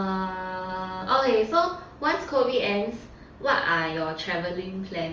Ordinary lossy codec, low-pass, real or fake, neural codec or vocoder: Opus, 32 kbps; 7.2 kHz; real; none